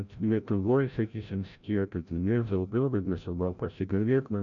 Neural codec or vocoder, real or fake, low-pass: codec, 16 kHz, 0.5 kbps, FreqCodec, larger model; fake; 7.2 kHz